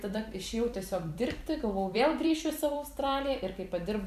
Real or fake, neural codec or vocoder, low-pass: fake; vocoder, 44.1 kHz, 128 mel bands every 256 samples, BigVGAN v2; 14.4 kHz